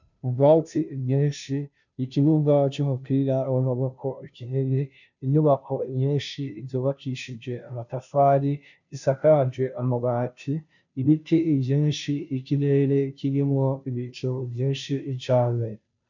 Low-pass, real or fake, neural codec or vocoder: 7.2 kHz; fake; codec, 16 kHz, 0.5 kbps, FunCodec, trained on Chinese and English, 25 frames a second